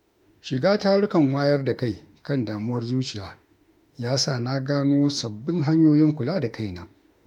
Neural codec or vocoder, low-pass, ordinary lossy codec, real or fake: autoencoder, 48 kHz, 32 numbers a frame, DAC-VAE, trained on Japanese speech; 19.8 kHz; MP3, 96 kbps; fake